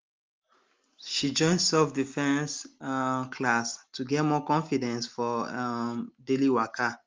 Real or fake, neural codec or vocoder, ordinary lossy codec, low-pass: real; none; Opus, 32 kbps; 7.2 kHz